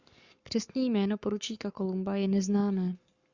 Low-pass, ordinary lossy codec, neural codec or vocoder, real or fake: 7.2 kHz; Opus, 64 kbps; codec, 44.1 kHz, 7.8 kbps, Pupu-Codec; fake